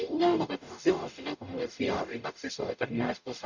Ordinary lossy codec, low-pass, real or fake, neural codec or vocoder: none; 7.2 kHz; fake; codec, 44.1 kHz, 0.9 kbps, DAC